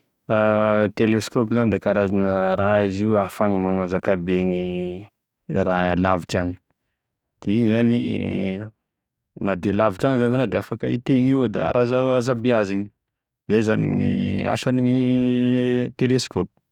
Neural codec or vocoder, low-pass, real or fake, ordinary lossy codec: codec, 44.1 kHz, 2.6 kbps, DAC; 19.8 kHz; fake; none